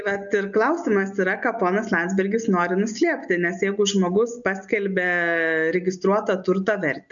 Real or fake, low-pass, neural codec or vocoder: real; 7.2 kHz; none